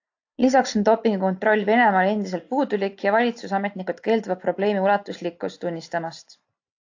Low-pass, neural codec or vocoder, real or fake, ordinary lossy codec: 7.2 kHz; none; real; AAC, 48 kbps